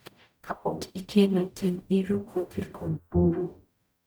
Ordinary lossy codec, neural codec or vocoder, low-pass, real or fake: none; codec, 44.1 kHz, 0.9 kbps, DAC; none; fake